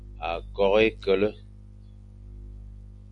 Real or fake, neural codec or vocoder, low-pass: real; none; 10.8 kHz